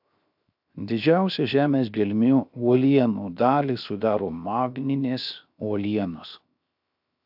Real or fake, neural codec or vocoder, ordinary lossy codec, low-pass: fake; codec, 16 kHz, 0.7 kbps, FocalCodec; AAC, 48 kbps; 5.4 kHz